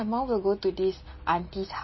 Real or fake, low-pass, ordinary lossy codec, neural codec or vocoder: real; 7.2 kHz; MP3, 24 kbps; none